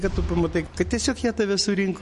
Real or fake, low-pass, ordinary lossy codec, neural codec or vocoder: real; 14.4 kHz; MP3, 48 kbps; none